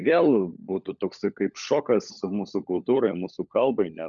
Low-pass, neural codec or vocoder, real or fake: 7.2 kHz; codec, 16 kHz, 16 kbps, FunCodec, trained on LibriTTS, 50 frames a second; fake